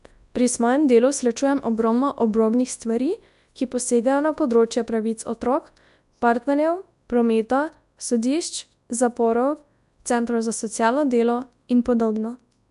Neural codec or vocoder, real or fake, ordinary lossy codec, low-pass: codec, 24 kHz, 0.9 kbps, WavTokenizer, large speech release; fake; AAC, 96 kbps; 10.8 kHz